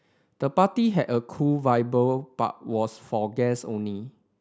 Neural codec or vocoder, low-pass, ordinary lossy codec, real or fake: none; none; none; real